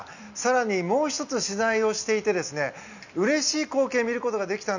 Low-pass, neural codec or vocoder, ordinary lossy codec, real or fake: 7.2 kHz; none; none; real